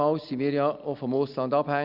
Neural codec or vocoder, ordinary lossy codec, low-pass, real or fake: none; Opus, 64 kbps; 5.4 kHz; real